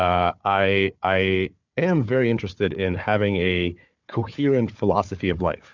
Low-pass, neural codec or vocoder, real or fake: 7.2 kHz; codec, 16 kHz, 4 kbps, FunCodec, trained on Chinese and English, 50 frames a second; fake